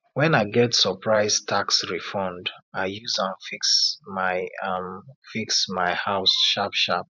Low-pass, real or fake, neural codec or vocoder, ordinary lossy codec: 7.2 kHz; fake; vocoder, 44.1 kHz, 128 mel bands every 512 samples, BigVGAN v2; none